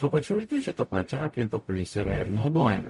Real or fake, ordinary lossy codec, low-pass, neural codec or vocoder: fake; MP3, 48 kbps; 14.4 kHz; codec, 44.1 kHz, 0.9 kbps, DAC